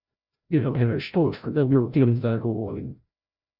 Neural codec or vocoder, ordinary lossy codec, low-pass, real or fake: codec, 16 kHz, 0.5 kbps, FreqCodec, larger model; Opus, 64 kbps; 5.4 kHz; fake